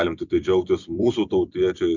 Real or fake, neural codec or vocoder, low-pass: fake; vocoder, 44.1 kHz, 128 mel bands every 256 samples, BigVGAN v2; 7.2 kHz